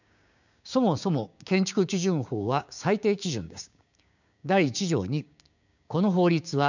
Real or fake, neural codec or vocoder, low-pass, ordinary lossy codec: fake; codec, 16 kHz, 6 kbps, DAC; 7.2 kHz; none